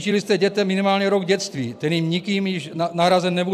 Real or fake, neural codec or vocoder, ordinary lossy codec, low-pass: fake; vocoder, 44.1 kHz, 128 mel bands every 256 samples, BigVGAN v2; AAC, 96 kbps; 14.4 kHz